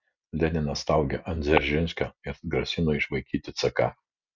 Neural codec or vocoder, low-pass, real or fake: none; 7.2 kHz; real